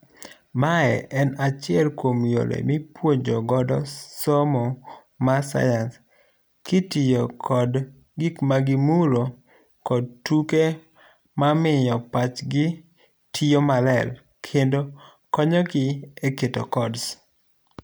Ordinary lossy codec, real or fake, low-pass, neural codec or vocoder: none; real; none; none